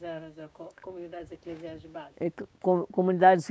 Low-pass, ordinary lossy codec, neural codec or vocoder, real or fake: none; none; codec, 16 kHz, 6 kbps, DAC; fake